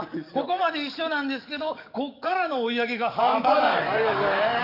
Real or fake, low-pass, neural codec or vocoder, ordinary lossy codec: fake; 5.4 kHz; codec, 44.1 kHz, 7.8 kbps, DAC; none